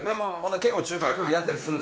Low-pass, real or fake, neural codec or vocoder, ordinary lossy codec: none; fake; codec, 16 kHz, 2 kbps, X-Codec, WavLM features, trained on Multilingual LibriSpeech; none